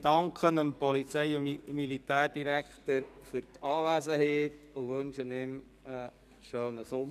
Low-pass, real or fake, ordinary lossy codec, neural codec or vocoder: 14.4 kHz; fake; none; codec, 44.1 kHz, 2.6 kbps, SNAC